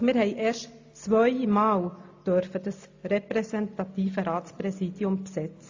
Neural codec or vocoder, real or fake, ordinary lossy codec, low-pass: none; real; AAC, 48 kbps; 7.2 kHz